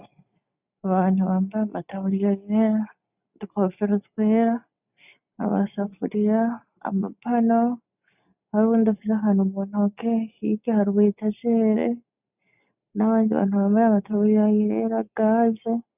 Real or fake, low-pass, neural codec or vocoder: real; 3.6 kHz; none